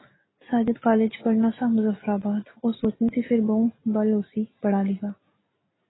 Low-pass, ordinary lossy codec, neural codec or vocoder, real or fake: 7.2 kHz; AAC, 16 kbps; none; real